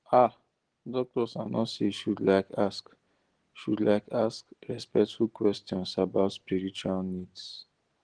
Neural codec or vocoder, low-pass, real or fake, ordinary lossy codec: none; 9.9 kHz; real; Opus, 16 kbps